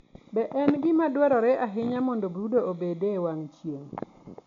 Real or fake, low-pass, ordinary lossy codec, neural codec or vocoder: real; 7.2 kHz; MP3, 64 kbps; none